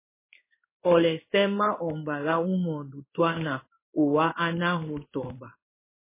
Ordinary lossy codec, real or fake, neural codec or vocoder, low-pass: MP3, 16 kbps; fake; codec, 16 kHz in and 24 kHz out, 1 kbps, XY-Tokenizer; 3.6 kHz